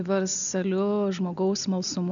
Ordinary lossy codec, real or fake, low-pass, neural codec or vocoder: MP3, 96 kbps; real; 7.2 kHz; none